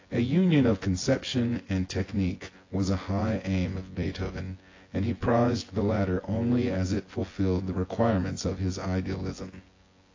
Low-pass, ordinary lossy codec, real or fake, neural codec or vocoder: 7.2 kHz; AAC, 32 kbps; fake; vocoder, 24 kHz, 100 mel bands, Vocos